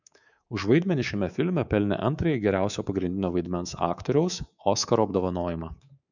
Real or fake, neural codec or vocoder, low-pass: fake; codec, 24 kHz, 3.1 kbps, DualCodec; 7.2 kHz